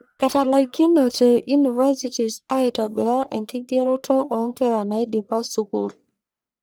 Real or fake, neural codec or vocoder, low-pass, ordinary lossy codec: fake; codec, 44.1 kHz, 1.7 kbps, Pupu-Codec; none; none